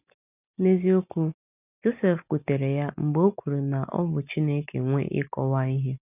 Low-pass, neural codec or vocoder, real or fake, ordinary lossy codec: 3.6 kHz; none; real; none